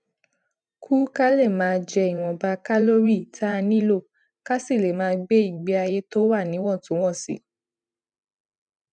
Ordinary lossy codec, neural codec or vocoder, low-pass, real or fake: none; vocoder, 44.1 kHz, 128 mel bands every 512 samples, BigVGAN v2; 9.9 kHz; fake